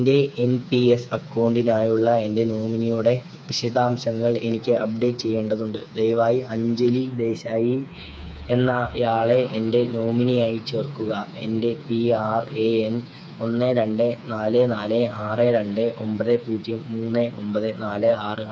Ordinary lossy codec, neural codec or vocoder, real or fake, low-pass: none; codec, 16 kHz, 4 kbps, FreqCodec, smaller model; fake; none